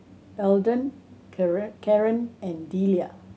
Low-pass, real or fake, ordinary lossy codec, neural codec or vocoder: none; real; none; none